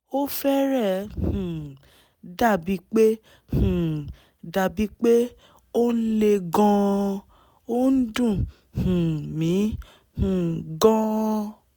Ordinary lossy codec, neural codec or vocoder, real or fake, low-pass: none; none; real; none